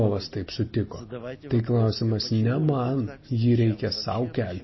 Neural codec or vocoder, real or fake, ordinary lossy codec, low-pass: none; real; MP3, 24 kbps; 7.2 kHz